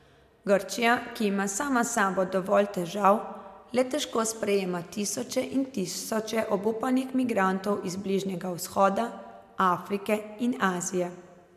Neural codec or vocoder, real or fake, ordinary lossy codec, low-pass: none; real; MP3, 96 kbps; 14.4 kHz